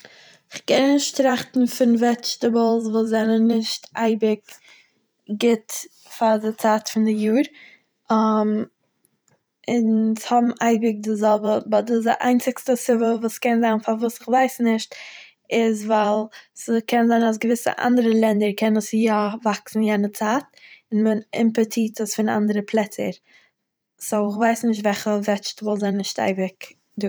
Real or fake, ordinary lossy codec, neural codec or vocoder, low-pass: fake; none; vocoder, 44.1 kHz, 128 mel bands every 512 samples, BigVGAN v2; none